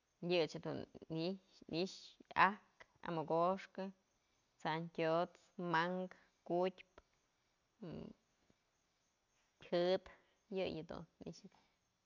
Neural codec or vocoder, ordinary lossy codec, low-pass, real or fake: none; none; 7.2 kHz; real